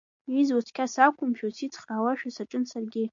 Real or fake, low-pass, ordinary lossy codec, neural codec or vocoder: real; 7.2 kHz; MP3, 64 kbps; none